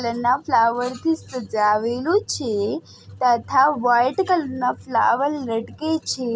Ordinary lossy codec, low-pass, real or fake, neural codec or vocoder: none; none; real; none